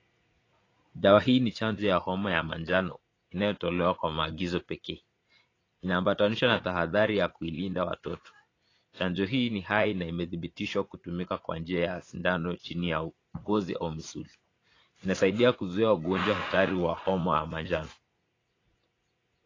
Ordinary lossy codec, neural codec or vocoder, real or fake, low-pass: AAC, 32 kbps; vocoder, 44.1 kHz, 80 mel bands, Vocos; fake; 7.2 kHz